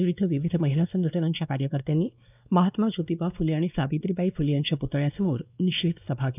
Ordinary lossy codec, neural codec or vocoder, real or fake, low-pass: none; codec, 16 kHz, 2 kbps, X-Codec, WavLM features, trained on Multilingual LibriSpeech; fake; 3.6 kHz